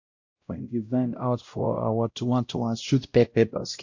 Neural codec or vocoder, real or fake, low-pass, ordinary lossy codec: codec, 16 kHz, 0.5 kbps, X-Codec, WavLM features, trained on Multilingual LibriSpeech; fake; 7.2 kHz; AAC, 48 kbps